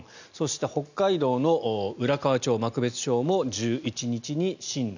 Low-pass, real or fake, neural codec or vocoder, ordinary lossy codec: 7.2 kHz; real; none; none